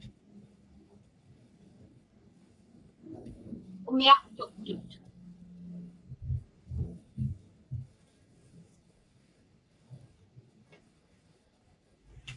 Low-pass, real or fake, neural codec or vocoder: 10.8 kHz; fake; codec, 44.1 kHz, 3.4 kbps, Pupu-Codec